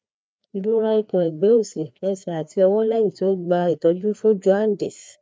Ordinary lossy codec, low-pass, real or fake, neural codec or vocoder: none; none; fake; codec, 16 kHz, 2 kbps, FreqCodec, larger model